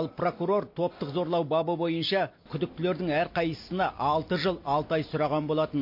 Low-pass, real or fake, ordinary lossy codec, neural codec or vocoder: 5.4 kHz; real; MP3, 32 kbps; none